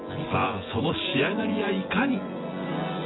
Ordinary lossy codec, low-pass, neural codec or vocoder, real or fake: AAC, 16 kbps; 7.2 kHz; vocoder, 24 kHz, 100 mel bands, Vocos; fake